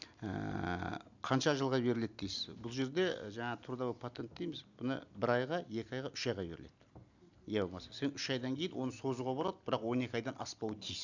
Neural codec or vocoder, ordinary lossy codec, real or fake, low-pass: none; none; real; 7.2 kHz